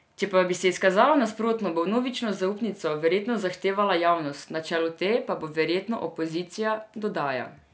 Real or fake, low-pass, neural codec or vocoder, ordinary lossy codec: real; none; none; none